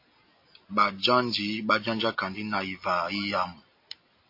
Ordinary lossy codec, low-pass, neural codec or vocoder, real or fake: MP3, 32 kbps; 5.4 kHz; none; real